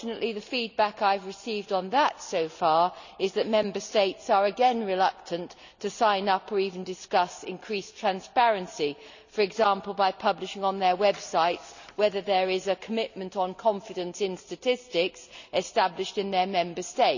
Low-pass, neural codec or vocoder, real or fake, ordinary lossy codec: 7.2 kHz; none; real; none